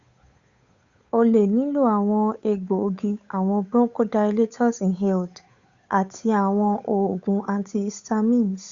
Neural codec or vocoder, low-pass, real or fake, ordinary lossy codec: codec, 16 kHz, 8 kbps, FunCodec, trained on Chinese and English, 25 frames a second; 7.2 kHz; fake; Opus, 64 kbps